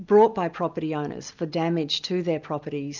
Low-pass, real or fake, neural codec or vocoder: 7.2 kHz; real; none